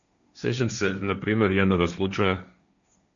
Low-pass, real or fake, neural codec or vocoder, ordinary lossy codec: 7.2 kHz; fake; codec, 16 kHz, 1.1 kbps, Voila-Tokenizer; MP3, 96 kbps